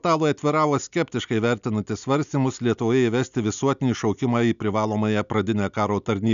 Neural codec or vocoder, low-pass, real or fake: none; 7.2 kHz; real